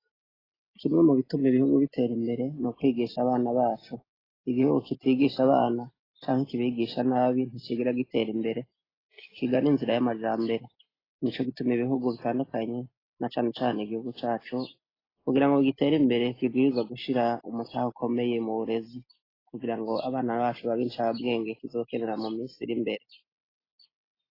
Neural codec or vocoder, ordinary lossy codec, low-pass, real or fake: none; AAC, 24 kbps; 5.4 kHz; real